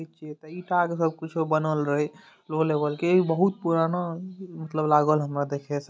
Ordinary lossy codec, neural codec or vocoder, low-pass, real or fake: none; none; none; real